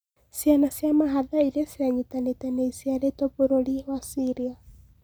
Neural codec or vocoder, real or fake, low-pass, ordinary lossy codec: none; real; none; none